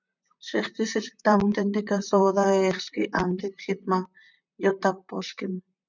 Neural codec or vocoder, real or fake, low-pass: vocoder, 44.1 kHz, 128 mel bands, Pupu-Vocoder; fake; 7.2 kHz